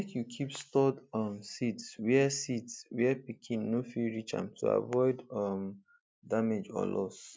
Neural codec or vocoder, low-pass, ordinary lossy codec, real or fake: none; none; none; real